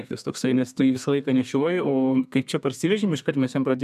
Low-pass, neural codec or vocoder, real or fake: 14.4 kHz; codec, 32 kHz, 1.9 kbps, SNAC; fake